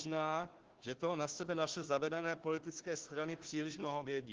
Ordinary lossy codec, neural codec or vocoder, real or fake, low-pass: Opus, 16 kbps; codec, 16 kHz, 1 kbps, FunCodec, trained on Chinese and English, 50 frames a second; fake; 7.2 kHz